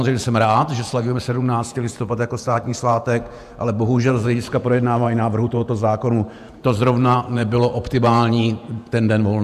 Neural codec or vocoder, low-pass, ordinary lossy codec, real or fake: vocoder, 48 kHz, 128 mel bands, Vocos; 14.4 kHz; AAC, 96 kbps; fake